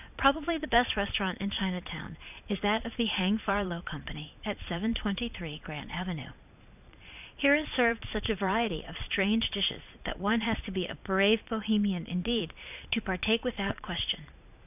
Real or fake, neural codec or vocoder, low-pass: fake; vocoder, 22.05 kHz, 80 mel bands, Vocos; 3.6 kHz